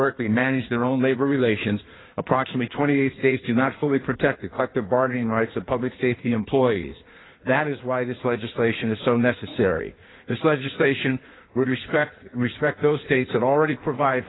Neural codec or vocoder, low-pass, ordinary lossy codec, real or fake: codec, 16 kHz in and 24 kHz out, 1.1 kbps, FireRedTTS-2 codec; 7.2 kHz; AAC, 16 kbps; fake